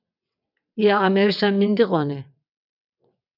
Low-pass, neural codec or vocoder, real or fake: 5.4 kHz; vocoder, 22.05 kHz, 80 mel bands, WaveNeXt; fake